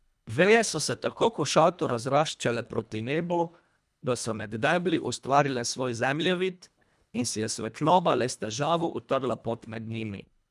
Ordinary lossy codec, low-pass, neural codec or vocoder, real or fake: none; none; codec, 24 kHz, 1.5 kbps, HILCodec; fake